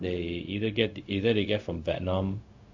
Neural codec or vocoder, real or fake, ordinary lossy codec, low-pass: codec, 16 kHz, 0.4 kbps, LongCat-Audio-Codec; fake; MP3, 48 kbps; 7.2 kHz